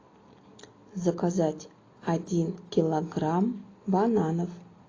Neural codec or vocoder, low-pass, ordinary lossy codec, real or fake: none; 7.2 kHz; AAC, 32 kbps; real